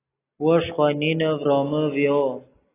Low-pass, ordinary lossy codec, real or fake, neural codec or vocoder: 3.6 kHz; AAC, 16 kbps; real; none